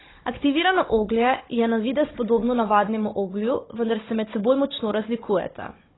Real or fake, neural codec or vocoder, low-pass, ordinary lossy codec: fake; codec, 16 kHz, 16 kbps, FunCodec, trained on Chinese and English, 50 frames a second; 7.2 kHz; AAC, 16 kbps